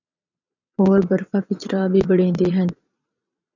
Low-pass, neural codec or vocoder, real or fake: 7.2 kHz; vocoder, 22.05 kHz, 80 mel bands, Vocos; fake